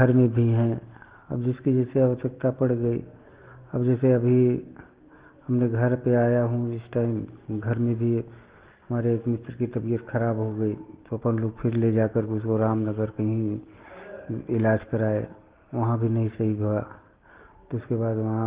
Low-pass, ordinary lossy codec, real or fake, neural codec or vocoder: 3.6 kHz; Opus, 16 kbps; real; none